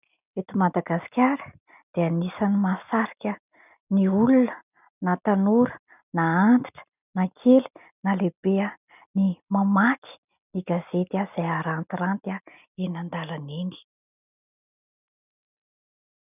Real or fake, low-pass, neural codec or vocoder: real; 3.6 kHz; none